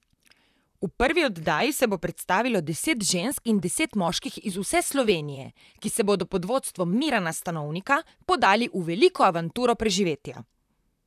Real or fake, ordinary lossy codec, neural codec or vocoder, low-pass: fake; none; vocoder, 44.1 kHz, 128 mel bands, Pupu-Vocoder; 14.4 kHz